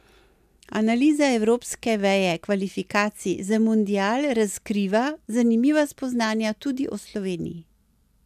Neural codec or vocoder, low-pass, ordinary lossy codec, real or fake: none; 14.4 kHz; MP3, 96 kbps; real